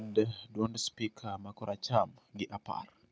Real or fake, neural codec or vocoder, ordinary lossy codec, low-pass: real; none; none; none